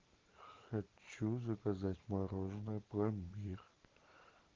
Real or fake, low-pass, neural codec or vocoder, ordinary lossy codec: real; 7.2 kHz; none; Opus, 16 kbps